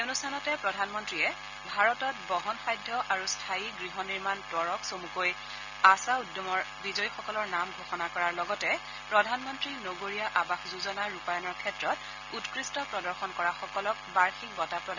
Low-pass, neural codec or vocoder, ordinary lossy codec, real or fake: 7.2 kHz; none; none; real